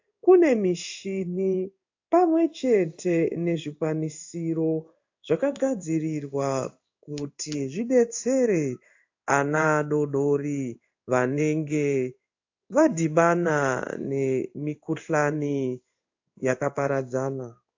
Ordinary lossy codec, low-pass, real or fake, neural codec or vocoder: AAC, 48 kbps; 7.2 kHz; fake; codec, 16 kHz in and 24 kHz out, 1 kbps, XY-Tokenizer